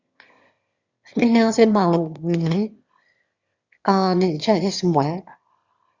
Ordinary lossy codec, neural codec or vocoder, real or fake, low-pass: Opus, 64 kbps; autoencoder, 22.05 kHz, a latent of 192 numbers a frame, VITS, trained on one speaker; fake; 7.2 kHz